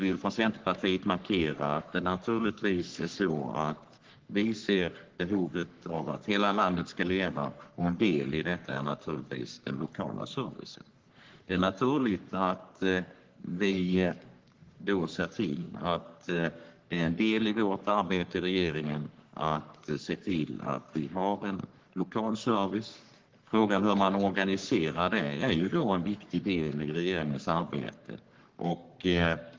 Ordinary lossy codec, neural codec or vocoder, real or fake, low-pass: Opus, 16 kbps; codec, 44.1 kHz, 3.4 kbps, Pupu-Codec; fake; 7.2 kHz